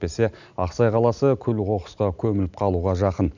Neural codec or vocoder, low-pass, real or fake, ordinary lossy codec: none; 7.2 kHz; real; none